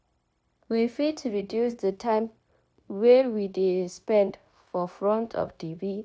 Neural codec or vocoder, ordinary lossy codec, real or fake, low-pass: codec, 16 kHz, 0.9 kbps, LongCat-Audio-Codec; none; fake; none